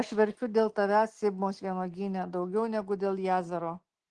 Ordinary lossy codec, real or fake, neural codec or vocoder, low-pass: Opus, 16 kbps; real; none; 9.9 kHz